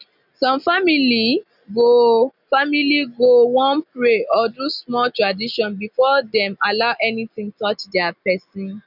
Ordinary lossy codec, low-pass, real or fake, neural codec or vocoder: none; 5.4 kHz; real; none